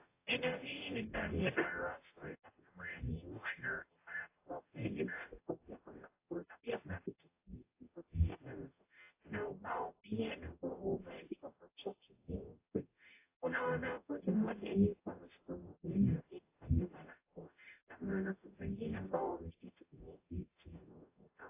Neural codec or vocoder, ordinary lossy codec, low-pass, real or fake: codec, 44.1 kHz, 0.9 kbps, DAC; AAC, 24 kbps; 3.6 kHz; fake